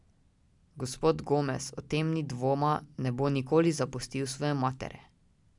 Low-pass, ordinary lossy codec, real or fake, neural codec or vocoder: 10.8 kHz; none; real; none